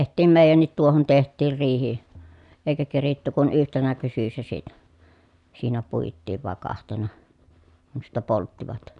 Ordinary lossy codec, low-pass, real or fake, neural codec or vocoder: none; 10.8 kHz; real; none